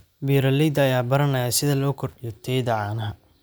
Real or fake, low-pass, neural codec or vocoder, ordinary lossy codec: real; none; none; none